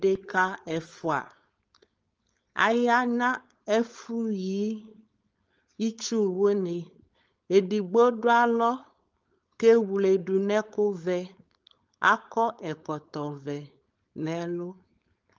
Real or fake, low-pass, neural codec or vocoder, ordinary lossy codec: fake; 7.2 kHz; codec, 16 kHz, 4.8 kbps, FACodec; Opus, 24 kbps